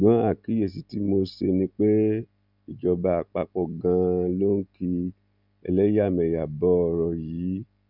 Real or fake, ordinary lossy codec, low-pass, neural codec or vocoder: real; none; 5.4 kHz; none